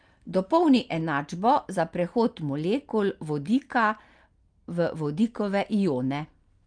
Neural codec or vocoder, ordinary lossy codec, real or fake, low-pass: none; Opus, 32 kbps; real; 9.9 kHz